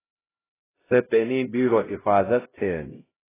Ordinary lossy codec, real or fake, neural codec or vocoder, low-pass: AAC, 16 kbps; fake; codec, 16 kHz, 0.5 kbps, X-Codec, HuBERT features, trained on LibriSpeech; 3.6 kHz